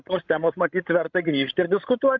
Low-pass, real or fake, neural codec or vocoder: 7.2 kHz; fake; codec, 16 kHz, 16 kbps, FreqCodec, larger model